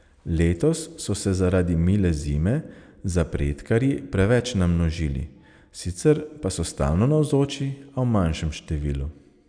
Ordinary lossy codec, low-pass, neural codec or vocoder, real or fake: none; 9.9 kHz; none; real